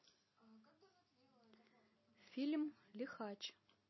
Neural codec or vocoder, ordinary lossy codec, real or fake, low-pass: none; MP3, 24 kbps; real; 7.2 kHz